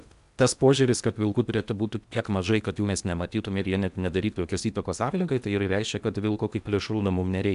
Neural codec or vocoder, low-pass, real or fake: codec, 16 kHz in and 24 kHz out, 0.8 kbps, FocalCodec, streaming, 65536 codes; 10.8 kHz; fake